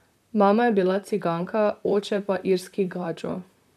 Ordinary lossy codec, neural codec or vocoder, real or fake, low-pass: none; vocoder, 44.1 kHz, 128 mel bands, Pupu-Vocoder; fake; 14.4 kHz